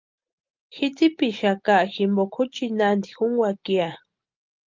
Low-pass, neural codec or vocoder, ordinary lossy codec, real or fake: 7.2 kHz; none; Opus, 32 kbps; real